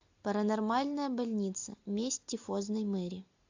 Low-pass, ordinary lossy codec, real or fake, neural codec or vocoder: 7.2 kHz; AAC, 48 kbps; real; none